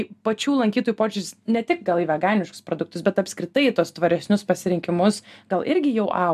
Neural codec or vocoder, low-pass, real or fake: none; 14.4 kHz; real